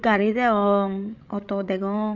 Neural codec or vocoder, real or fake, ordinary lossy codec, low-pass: codec, 16 kHz, 16 kbps, FreqCodec, larger model; fake; none; 7.2 kHz